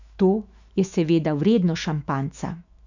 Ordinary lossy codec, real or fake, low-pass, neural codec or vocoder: none; fake; 7.2 kHz; autoencoder, 48 kHz, 128 numbers a frame, DAC-VAE, trained on Japanese speech